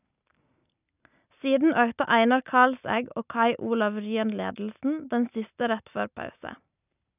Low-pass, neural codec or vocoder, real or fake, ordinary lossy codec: 3.6 kHz; none; real; none